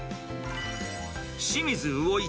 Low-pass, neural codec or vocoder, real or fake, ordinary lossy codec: none; none; real; none